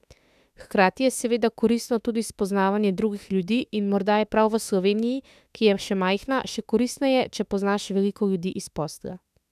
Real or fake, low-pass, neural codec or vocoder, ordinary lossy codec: fake; 14.4 kHz; autoencoder, 48 kHz, 32 numbers a frame, DAC-VAE, trained on Japanese speech; none